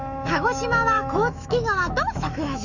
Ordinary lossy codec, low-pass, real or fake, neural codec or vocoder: none; 7.2 kHz; fake; autoencoder, 48 kHz, 128 numbers a frame, DAC-VAE, trained on Japanese speech